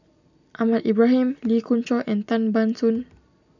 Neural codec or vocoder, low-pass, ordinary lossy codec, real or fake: none; 7.2 kHz; none; real